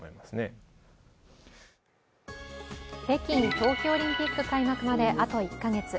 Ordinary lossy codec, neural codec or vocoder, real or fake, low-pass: none; none; real; none